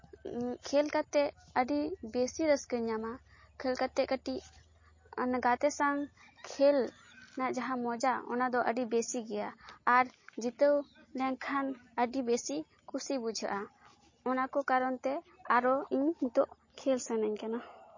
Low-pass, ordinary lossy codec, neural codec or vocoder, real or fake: 7.2 kHz; MP3, 32 kbps; none; real